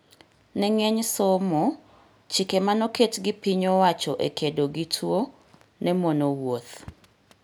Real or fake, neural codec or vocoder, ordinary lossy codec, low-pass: real; none; none; none